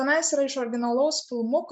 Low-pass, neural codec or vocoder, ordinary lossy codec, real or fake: 9.9 kHz; none; MP3, 96 kbps; real